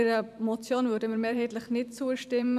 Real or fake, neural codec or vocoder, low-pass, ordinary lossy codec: fake; vocoder, 44.1 kHz, 128 mel bands every 512 samples, BigVGAN v2; 14.4 kHz; none